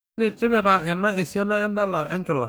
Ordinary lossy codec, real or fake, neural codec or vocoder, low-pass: none; fake; codec, 44.1 kHz, 2.6 kbps, DAC; none